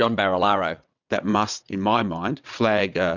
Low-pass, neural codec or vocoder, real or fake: 7.2 kHz; vocoder, 22.05 kHz, 80 mel bands, WaveNeXt; fake